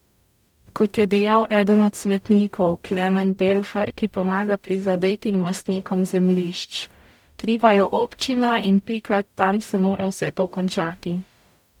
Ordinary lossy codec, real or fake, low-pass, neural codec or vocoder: none; fake; 19.8 kHz; codec, 44.1 kHz, 0.9 kbps, DAC